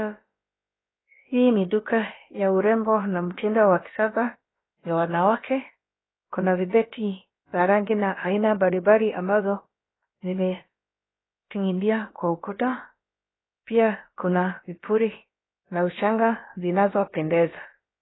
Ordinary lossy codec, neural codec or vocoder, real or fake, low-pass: AAC, 16 kbps; codec, 16 kHz, about 1 kbps, DyCAST, with the encoder's durations; fake; 7.2 kHz